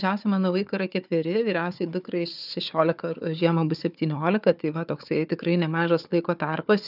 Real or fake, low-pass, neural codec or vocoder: fake; 5.4 kHz; codec, 16 kHz, 4 kbps, FunCodec, trained on Chinese and English, 50 frames a second